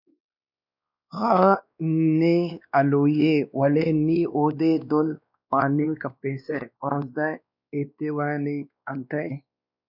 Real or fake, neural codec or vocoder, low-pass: fake; codec, 16 kHz, 2 kbps, X-Codec, WavLM features, trained on Multilingual LibriSpeech; 5.4 kHz